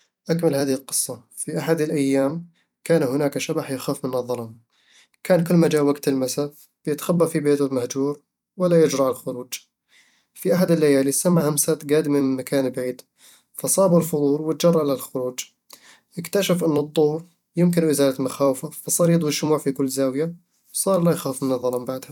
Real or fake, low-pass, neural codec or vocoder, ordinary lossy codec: fake; 19.8 kHz; vocoder, 44.1 kHz, 128 mel bands every 256 samples, BigVGAN v2; none